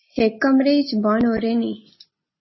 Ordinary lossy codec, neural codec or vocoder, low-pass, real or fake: MP3, 24 kbps; none; 7.2 kHz; real